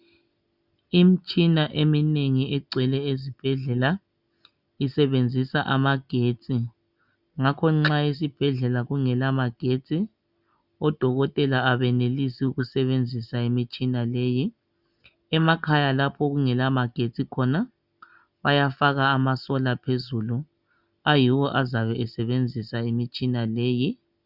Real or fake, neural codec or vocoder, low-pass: real; none; 5.4 kHz